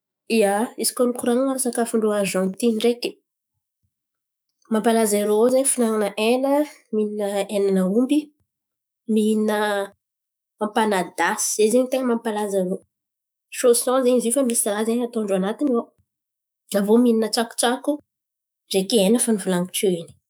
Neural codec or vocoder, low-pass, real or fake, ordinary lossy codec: autoencoder, 48 kHz, 128 numbers a frame, DAC-VAE, trained on Japanese speech; none; fake; none